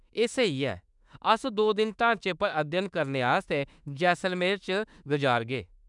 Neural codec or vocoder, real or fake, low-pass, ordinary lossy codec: autoencoder, 48 kHz, 32 numbers a frame, DAC-VAE, trained on Japanese speech; fake; 10.8 kHz; none